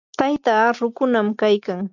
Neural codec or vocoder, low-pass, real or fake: none; 7.2 kHz; real